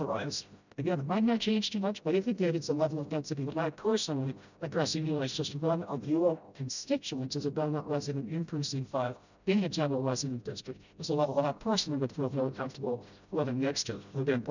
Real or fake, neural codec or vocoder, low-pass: fake; codec, 16 kHz, 0.5 kbps, FreqCodec, smaller model; 7.2 kHz